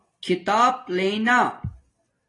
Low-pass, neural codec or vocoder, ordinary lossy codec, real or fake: 10.8 kHz; none; AAC, 32 kbps; real